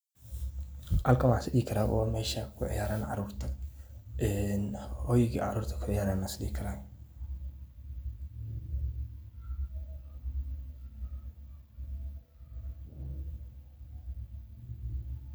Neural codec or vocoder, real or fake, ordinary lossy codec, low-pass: none; real; none; none